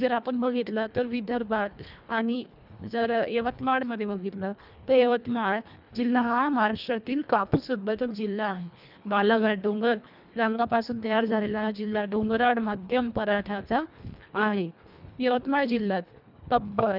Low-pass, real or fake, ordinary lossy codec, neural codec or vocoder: 5.4 kHz; fake; none; codec, 24 kHz, 1.5 kbps, HILCodec